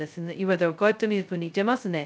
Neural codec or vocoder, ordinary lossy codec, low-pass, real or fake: codec, 16 kHz, 0.2 kbps, FocalCodec; none; none; fake